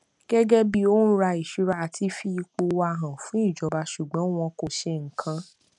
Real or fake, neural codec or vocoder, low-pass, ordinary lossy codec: real; none; 10.8 kHz; none